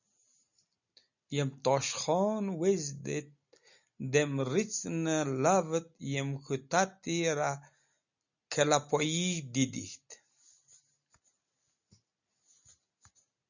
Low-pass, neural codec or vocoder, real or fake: 7.2 kHz; none; real